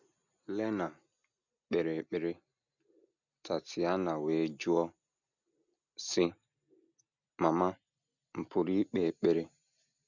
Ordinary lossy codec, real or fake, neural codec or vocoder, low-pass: none; real; none; 7.2 kHz